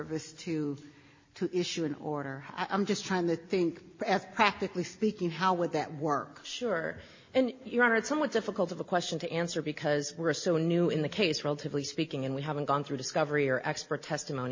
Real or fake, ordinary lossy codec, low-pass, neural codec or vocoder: real; MP3, 32 kbps; 7.2 kHz; none